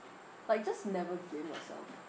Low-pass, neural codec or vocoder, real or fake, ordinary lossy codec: none; none; real; none